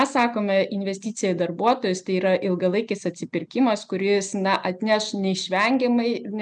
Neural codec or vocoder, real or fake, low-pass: none; real; 10.8 kHz